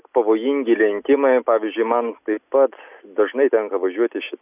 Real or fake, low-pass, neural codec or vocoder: real; 3.6 kHz; none